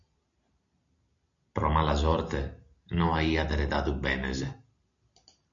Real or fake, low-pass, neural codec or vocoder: real; 7.2 kHz; none